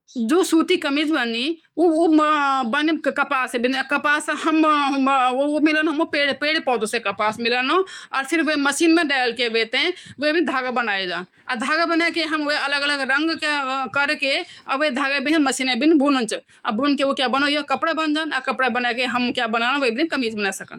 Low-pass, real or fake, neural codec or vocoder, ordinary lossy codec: 19.8 kHz; fake; codec, 44.1 kHz, 7.8 kbps, DAC; none